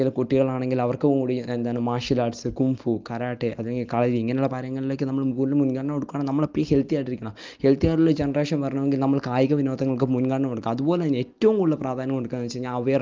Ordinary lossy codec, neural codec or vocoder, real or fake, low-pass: Opus, 24 kbps; none; real; 7.2 kHz